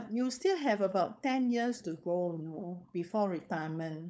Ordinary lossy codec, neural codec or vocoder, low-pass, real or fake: none; codec, 16 kHz, 4.8 kbps, FACodec; none; fake